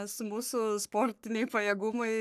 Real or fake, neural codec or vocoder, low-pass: fake; codec, 44.1 kHz, 3.4 kbps, Pupu-Codec; 14.4 kHz